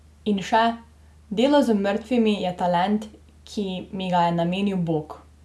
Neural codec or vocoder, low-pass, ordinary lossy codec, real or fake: none; none; none; real